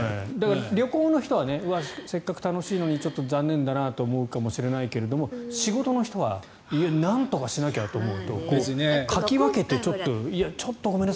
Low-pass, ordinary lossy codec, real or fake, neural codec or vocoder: none; none; real; none